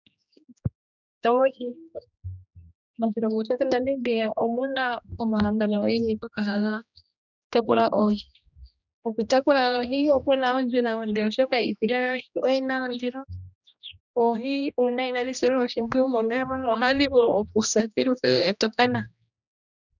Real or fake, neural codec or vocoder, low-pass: fake; codec, 16 kHz, 1 kbps, X-Codec, HuBERT features, trained on general audio; 7.2 kHz